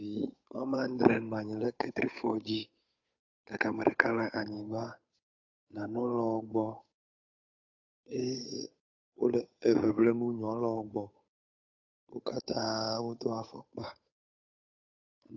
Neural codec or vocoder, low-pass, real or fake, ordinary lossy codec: codec, 16 kHz, 8 kbps, FunCodec, trained on Chinese and English, 25 frames a second; 7.2 kHz; fake; AAC, 48 kbps